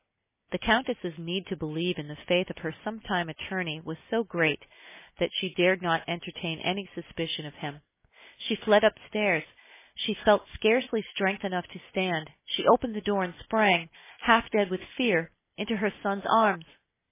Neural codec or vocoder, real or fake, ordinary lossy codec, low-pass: none; real; MP3, 16 kbps; 3.6 kHz